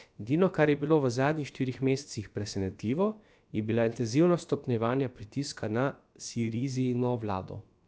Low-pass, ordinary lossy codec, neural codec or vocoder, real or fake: none; none; codec, 16 kHz, about 1 kbps, DyCAST, with the encoder's durations; fake